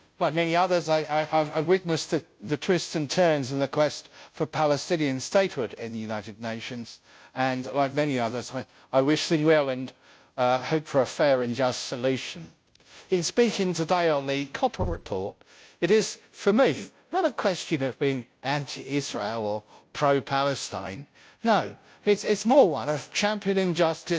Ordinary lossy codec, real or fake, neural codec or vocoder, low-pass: none; fake; codec, 16 kHz, 0.5 kbps, FunCodec, trained on Chinese and English, 25 frames a second; none